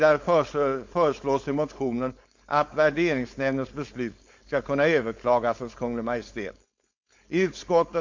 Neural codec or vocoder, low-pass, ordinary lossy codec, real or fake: codec, 16 kHz, 4.8 kbps, FACodec; 7.2 kHz; MP3, 48 kbps; fake